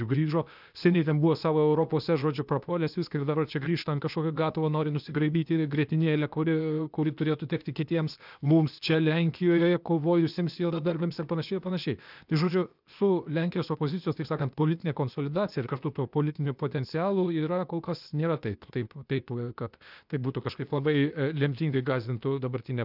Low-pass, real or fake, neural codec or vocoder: 5.4 kHz; fake; codec, 16 kHz, 0.8 kbps, ZipCodec